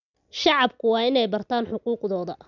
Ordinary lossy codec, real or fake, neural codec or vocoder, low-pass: none; real; none; 7.2 kHz